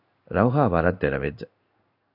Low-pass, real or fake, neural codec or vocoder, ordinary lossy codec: 5.4 kHz; fake; codec, 16 kHz in and 24 kHz out, 1 kbps, XY-Tokenizer; AAC, 48 kbps